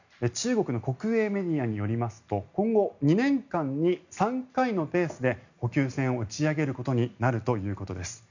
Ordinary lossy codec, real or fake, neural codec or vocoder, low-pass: none; real; none; 7.2 kHz